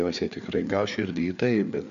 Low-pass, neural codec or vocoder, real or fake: 7.2 kHz; codec, 16 kHz, 4 kbps, FreqCodec, larger model; fake